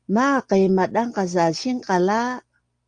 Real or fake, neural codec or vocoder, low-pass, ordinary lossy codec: real; none; 9.9 kHz; Opus, 32 kbps